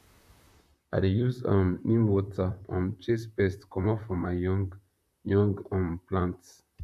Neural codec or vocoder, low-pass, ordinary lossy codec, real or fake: vocoder, 44.1 kHz, 128 mel bands, Pupu-Vocoder; 14.4 kHz; none; fake